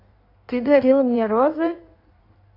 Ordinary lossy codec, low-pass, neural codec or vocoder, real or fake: AAC, 32 kbps; 5.4 kHz; codec, 16 kHz in and 24 kHz out, 1.1 kbps, FireRedTTS-2 codec; fake